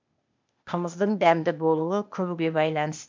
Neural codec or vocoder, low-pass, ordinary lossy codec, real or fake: codec, 16 kHz, 0.8 kbps, ZipCodec; 7.2 kHz; MP3, 64 kbps; fake